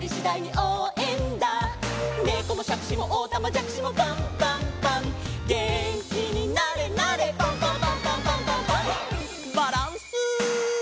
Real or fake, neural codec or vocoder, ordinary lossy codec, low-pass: real; none; none; none